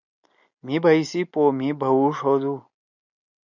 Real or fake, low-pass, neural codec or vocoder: real; 7.2 kHz; none